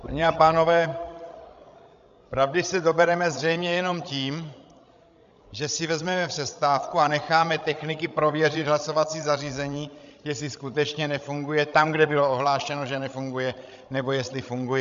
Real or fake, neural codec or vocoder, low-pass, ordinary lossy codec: fake; codec, 16 kHz, 16 kbps, FreqCodec, larger model; 7.2 kHz; MP3, 64 kbps